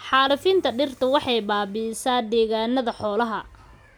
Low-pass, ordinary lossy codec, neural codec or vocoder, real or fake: none; none; none; real